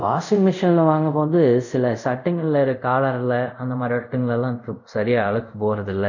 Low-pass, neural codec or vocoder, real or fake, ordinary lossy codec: 7.2 kHz; codec, 24 kHz, 0.5 kbps, DualCodec; fake; none